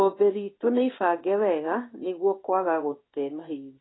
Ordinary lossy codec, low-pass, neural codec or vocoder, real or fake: AAC, 16 kbps; 7.2 kHz; codec, 16 kHz in and 24 kHz out, 1 kbps, XY-Tokenizer; fake